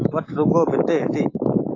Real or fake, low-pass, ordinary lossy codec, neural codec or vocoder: fake; 7.2 kHz; AAC, 32 kbps; autoencoder, 48 kHz, 128 numbers a frame, DAC-VAE, trained on Japanese speech